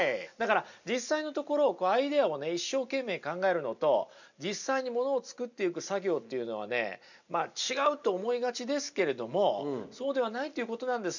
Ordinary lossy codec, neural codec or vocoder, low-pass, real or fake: none; none; 7.2 kHz; real